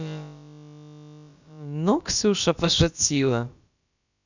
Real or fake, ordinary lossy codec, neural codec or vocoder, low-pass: fake; none; codec, 16 kHz, about 1 kbps, DyCAST, with the encoder's durations; 7.2 kHz